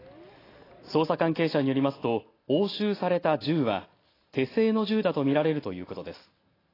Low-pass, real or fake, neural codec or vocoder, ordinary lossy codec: 5.4 kHz; real; none; AAC, 24 kbps